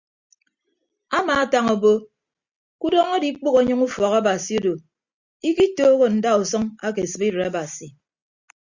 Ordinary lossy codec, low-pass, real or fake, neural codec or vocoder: Opus, 64 kbps; 7.2 kHz; real; none